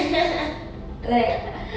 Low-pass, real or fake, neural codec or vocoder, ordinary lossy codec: none; real; none; none